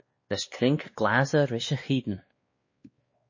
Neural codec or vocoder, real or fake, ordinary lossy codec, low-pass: codec, 16 kHz, 2 kbps, X-Codec, WavLM features, trained on Multilingual LibriSpeech; fake; MP3, 32 kbps; 7.2 kHz